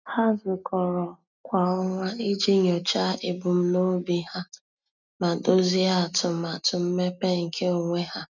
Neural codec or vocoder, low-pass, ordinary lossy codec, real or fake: none; 7.2 kHz; none; real